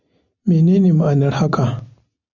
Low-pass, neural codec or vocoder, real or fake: 7.2 kHz; none; real